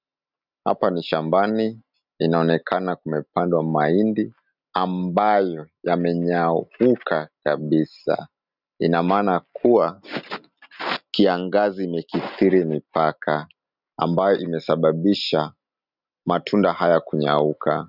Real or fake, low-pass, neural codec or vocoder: real; 5.4 kHz; none